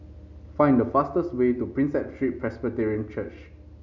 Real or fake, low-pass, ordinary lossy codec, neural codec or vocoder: real; 7.2 kHz; none; none